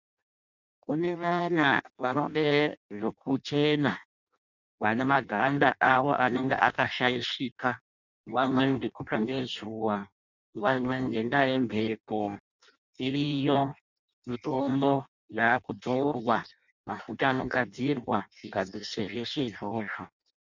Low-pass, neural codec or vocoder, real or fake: 7.2 kHz; codec, 16 kHz in and 24 kHz out, 0.6 kbps, FireRedTTS-2 codec; fake